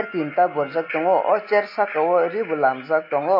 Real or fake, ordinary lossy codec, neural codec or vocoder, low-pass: real; MP3, 32 kbps; none; 5.4 kHz